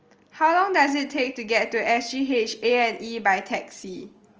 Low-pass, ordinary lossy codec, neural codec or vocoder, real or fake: 7.2 kHz; Opus, 24 kbps; none; real